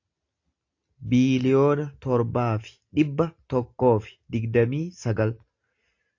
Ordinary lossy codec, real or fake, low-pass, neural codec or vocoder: AAC, 48 kbps; real; 7.2 kHz; none